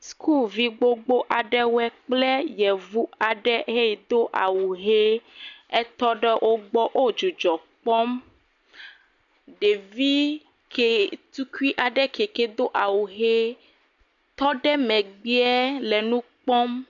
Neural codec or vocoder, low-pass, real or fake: none; 7.2 kHz; real